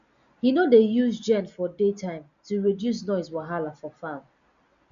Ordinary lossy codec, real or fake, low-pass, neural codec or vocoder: none; real; 7.2 kHz; none